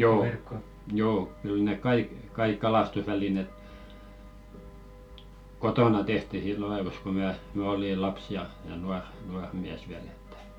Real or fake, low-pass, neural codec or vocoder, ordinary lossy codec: real; 19.8 kHz; none; none